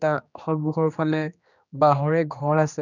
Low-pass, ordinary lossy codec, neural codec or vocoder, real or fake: 7.2 kHz; none; codec, 16 kHz, 2 kbps, X-Codec, HuBERT features, trained on general audio; fake